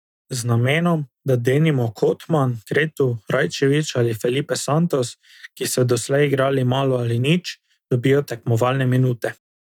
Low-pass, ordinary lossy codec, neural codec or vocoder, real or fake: 19.8 kHz; none; none; real